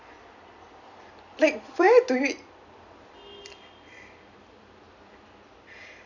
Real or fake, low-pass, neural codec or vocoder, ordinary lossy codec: fake; 7.2 kHz; vocoder, 44.1 kHz, 128 mel bands every 256 samples, BigVGAN v2; none